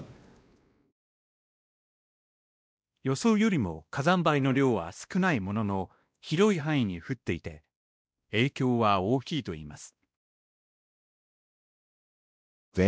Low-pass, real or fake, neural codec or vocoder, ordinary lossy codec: none; fake; codec, 16 kHz, 1 kbps, X-Codec, WavLM features, trained on Multilingual LibriSpeech; none